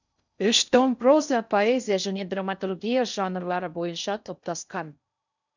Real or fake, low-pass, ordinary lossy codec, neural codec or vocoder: fake; 7.2 kHz; none; codec, 16 kHz in and 24 kHz out, 0.6 kbps, FocalCodec, streaming, 4096 codes